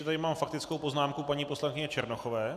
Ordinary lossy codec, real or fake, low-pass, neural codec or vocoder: AAC, 96 kbps; real; 14.4 kHz; none